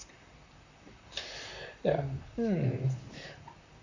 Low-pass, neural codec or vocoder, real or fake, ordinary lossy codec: 7.2 kHz; vocoder, 44.1 kHz, 128 mel bands, Pupu-Vocoder; fake; AAC, 48 kbps